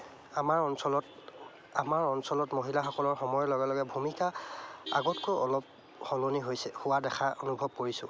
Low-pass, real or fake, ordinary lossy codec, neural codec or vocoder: none; real; none; none